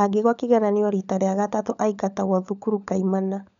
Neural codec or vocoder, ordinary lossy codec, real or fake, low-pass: codec, 16 kHz, 16 kbps, FunCodec, trained on LibriTTS, 50 frames a second; none; fake; 7.2 kHz